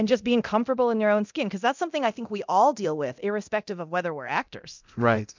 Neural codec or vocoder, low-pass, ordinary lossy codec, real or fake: codec, 24 kHz, 0.9 kbps, DualCodec; 7.2 kHz; MP3, 64 kbps; fake